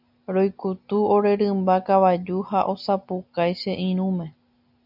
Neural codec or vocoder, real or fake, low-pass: none; real; 5.4 kHz